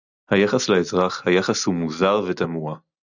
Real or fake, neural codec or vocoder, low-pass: real; none; 7.2 kHz